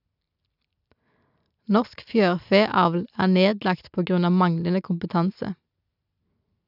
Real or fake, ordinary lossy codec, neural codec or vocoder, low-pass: real; none; none; 5.4 kHz